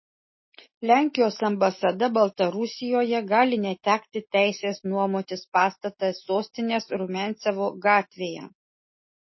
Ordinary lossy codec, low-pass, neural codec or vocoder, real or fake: MP3, 24 kbps; 7.2 kHz; none; real